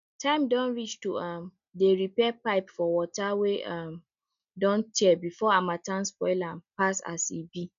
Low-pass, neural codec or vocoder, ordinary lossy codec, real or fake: 7.2 kHz; none; none; real